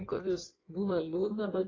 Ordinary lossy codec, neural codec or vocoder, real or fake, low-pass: AAC, 32 kbps; codec, 16 kHz in and 24 kHz out, 1.1 kbps, FireRedTTS-2 codec; fake; 7.2 kHz